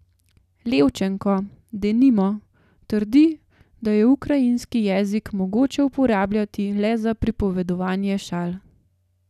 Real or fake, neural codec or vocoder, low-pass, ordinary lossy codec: real; none; 14.4 kHz; none